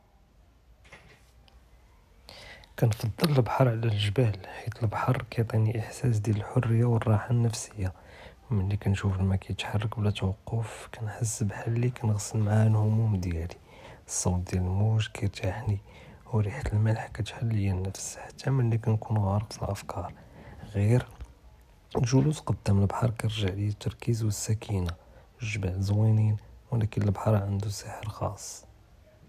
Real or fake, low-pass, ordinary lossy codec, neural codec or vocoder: real; 14.4 kHz; none; none